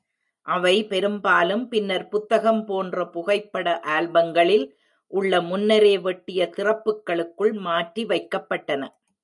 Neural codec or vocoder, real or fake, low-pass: none; real; 10.8 kHz